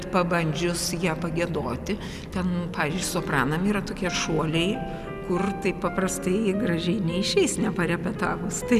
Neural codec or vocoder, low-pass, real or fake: none; 14.4 kHz; real